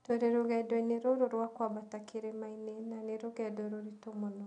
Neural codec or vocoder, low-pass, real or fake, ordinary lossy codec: none; 9.9 kHz; real; none